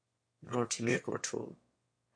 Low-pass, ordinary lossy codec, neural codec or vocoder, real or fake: 9.9 kHz; MP3, 48 kbps; autoencoder, 22.05 kHz, a latent of 192 numbers a frame, VITS, trained on one speaker; fake